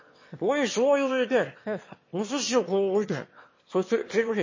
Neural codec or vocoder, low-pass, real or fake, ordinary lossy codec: autoencoder, 22.05 kHz, a latent of 192 numbers a frame, VITS, trained on one speaker; 7.2 kHz; fake; MP3, 32 kbps